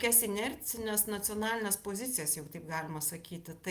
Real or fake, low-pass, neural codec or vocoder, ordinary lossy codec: real; 14.4 kHz; none; Opus, 32 kbps